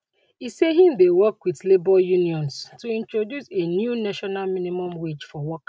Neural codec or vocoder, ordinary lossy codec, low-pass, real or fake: none; none; none; real